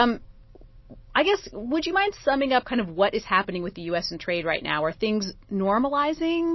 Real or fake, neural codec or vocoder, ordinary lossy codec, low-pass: real; none; MP3, 24 kbps; 7.2 kHz